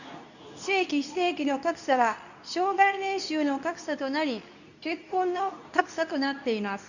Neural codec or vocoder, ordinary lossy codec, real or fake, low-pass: codec, 24 kHz, 0.9 kbps, WavTokenizer, medium speech release version 2; none; fake; 7.2 kHz